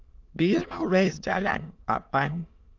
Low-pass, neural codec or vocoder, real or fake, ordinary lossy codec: 7.2 kHz; autoencoder, 22.05 kHz, a latent of 192 numbers a frame, VITS, trained on many speakers; fake; Opus, 24 kbps